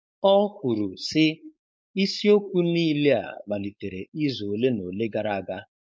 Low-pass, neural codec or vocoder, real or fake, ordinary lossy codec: none; codec, 16 kHz, 4.8 kbps, FACodec; fake; none